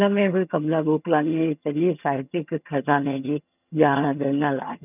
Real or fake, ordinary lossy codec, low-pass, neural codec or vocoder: fake; none; 3.6 kHz; vocoder, 22.05 kHz, 80 mel bands, HiFi-GAN